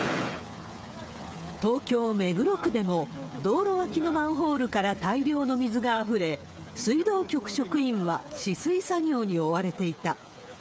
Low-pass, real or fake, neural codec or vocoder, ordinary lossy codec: none; fake; codec, 16 kHz, 8 kbps, FreqCodec, smaller model; none